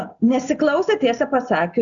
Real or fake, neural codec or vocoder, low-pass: real; none; 7.2 kHz